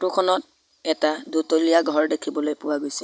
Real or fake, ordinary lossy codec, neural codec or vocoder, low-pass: real; none; none; none